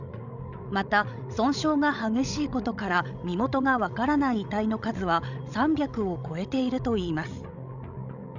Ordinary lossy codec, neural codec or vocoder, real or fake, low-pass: none; codec, 16 kHz, 16 kbps, FreqCodec, larger model; fake; 7.2 kHz